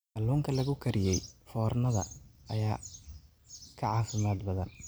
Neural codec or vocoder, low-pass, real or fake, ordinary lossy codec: none; none; real; none